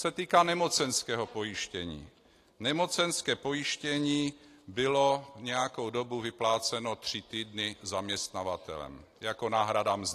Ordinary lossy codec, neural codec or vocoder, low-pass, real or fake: AAC, 48 kbps; none; 14.4 kHz; real